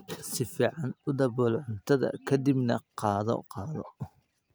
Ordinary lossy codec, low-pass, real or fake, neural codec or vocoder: none; none; real; none